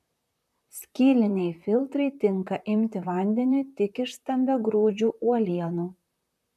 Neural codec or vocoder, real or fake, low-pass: vocoder, 44.1 kHz, 128 mel bands, Pupu-Vocoder; fake; 14.4 kHz